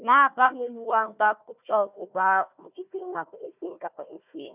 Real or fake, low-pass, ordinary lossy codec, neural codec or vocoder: fake; 3.6 kHz; none; codec, 16 kHz, 1 kbps, FunCodec, trained on Chinese and English, 50 frames a second